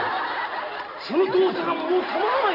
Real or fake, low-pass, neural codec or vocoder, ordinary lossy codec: real; 5.4 kHz; none; none